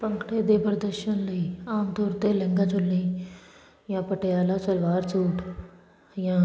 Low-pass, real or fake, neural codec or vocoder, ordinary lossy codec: none; real; none; none